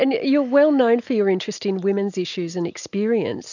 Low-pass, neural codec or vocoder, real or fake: 7.2 kHz; none; real